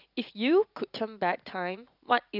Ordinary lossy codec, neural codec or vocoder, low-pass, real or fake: none; vocoder, 44.1 kHz, 80 mel bands, Vocos; 5.4 kHz; fake